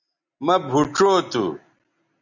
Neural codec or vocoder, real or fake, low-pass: none; real; 7.2 kHz